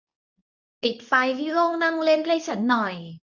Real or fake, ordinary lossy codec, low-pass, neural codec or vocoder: fake; none; 7.2 kHz; codec, 24 kHz, 0.9 kbps, WavTokenizer, medium speech release version 2